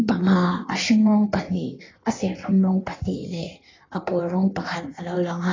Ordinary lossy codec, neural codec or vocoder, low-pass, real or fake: AAC, 32 kbps; codec, 16 kHz in and 24 kHz out, 1.1 kbps, FireRedTTS-2 codec; 7.2 kHz; fake